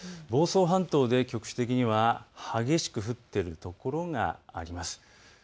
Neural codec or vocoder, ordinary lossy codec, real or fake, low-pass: none; none; real; none